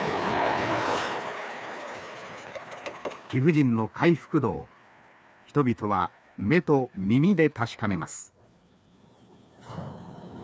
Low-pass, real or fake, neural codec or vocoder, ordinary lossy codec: none; fake; codec, 16 kHz, 2 kbps, FreqCodec, larger model; none